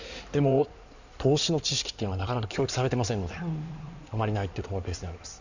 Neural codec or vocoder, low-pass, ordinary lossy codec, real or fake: codec, 16 kHz in and 24 kHz out, 2.2 kbps, FireRedTTS-2 codec; 7.2 kHz; none; fake